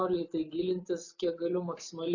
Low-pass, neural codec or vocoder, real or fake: 7.2 kHz; none; real